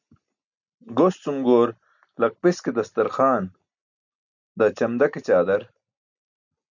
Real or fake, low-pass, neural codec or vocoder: real; 7.2 kHz; none